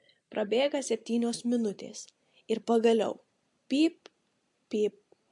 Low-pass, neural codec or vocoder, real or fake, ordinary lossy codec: 10.8 kHz; none; real; MP3, 64 kbps